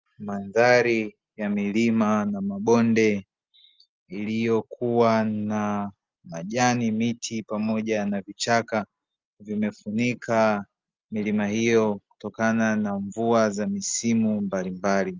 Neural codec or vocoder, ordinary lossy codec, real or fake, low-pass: none; Opus, 24 kbps; real; 7.2 kHz